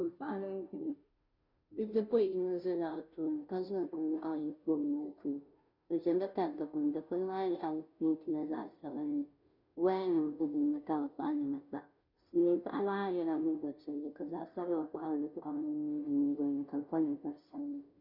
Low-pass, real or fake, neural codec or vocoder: 5.4 kHz; fake; codec, 16 kHz, 0.5 kbps, FunCodec, trained on Chinese and English, 25 frames a second